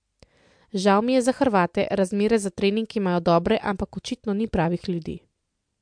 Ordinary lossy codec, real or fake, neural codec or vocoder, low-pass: MP3, 64 kbps; real; none; 9.9 kHz